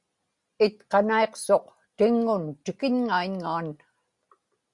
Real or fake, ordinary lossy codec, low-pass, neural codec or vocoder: real; Opus, 64 kbps; 10.8 kHz; none